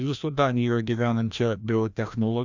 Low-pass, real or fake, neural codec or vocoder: 7.2 kHz; fake; codec, 16 kHz, 1 kbps, FreqCodec, larger model